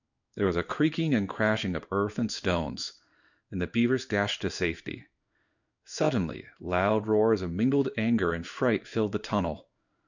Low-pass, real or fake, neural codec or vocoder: 7.2 kHz; fake; codec, 16 kHz in and 24 kHz out, 1 kbps, XY-Tokenizer